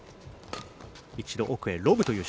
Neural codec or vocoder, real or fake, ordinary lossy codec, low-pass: none; real; none; none